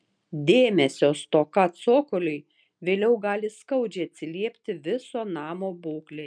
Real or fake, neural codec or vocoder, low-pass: real; none; 9.9 kHz